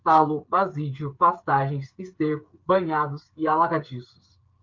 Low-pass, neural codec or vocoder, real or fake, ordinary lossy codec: 7.2 kHz; codec, 16 kHz, 8 kbps, FreqCodec, smaller model; fake; Opus, 32 kbps